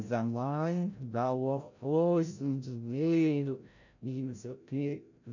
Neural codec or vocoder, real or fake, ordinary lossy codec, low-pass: codec, 16 kHz, 0.5 kbps, FreqCodec, larger model; fake; none; 7.2 kHz